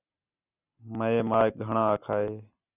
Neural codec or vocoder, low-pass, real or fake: none; 3.6 kHz; real